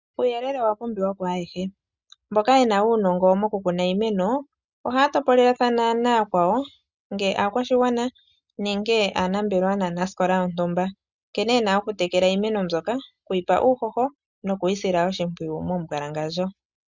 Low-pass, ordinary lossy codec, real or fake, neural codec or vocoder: 7.2 kHz; Opus, 64 kbps; real; none